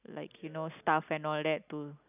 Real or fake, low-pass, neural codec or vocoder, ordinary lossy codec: real; 3.6 kHz; none; none